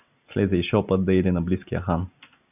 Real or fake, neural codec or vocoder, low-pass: real; none; 3.6 kHz